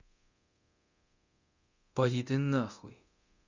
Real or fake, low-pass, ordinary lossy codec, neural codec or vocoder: fake; 7.2 kHz; Opus, 64 kbps; codec, 24 kHz, 0.9 kbps, DualCodec